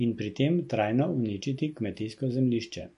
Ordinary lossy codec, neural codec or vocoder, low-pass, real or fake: MP3, 48 kbps; none; 10.8 kHz; real